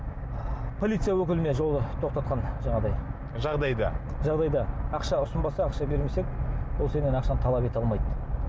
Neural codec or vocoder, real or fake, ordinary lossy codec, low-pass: none; real; none; none